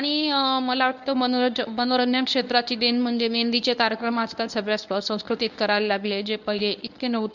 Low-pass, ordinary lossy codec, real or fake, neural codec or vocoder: 7.2 kHz; none; fake; codec, 24 kHz, 0.9 kbps, WavTokenizer, medium speech release version 1